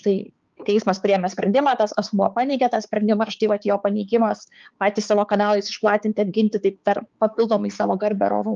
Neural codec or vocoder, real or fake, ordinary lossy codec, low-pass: codec, 16 kHz, 4 kbps, X-Codec, HuBERT features, trained on balanced general audio; fake; Opus, 24 kbps; 7.2 kHz